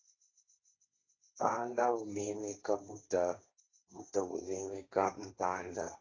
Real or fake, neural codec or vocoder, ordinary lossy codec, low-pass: fake; codec, 16 kHz, 1.1 kbps, Voila-Tokenizer; AAC, 32 kbps; 7.2 kHz